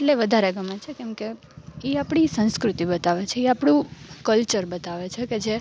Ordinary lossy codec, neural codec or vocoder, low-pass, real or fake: none; none; none; real